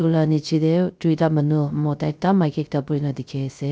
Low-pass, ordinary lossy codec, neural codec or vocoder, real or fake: none; none; codec, 16 kHz, 0.3 kbps, FocalCodec; fake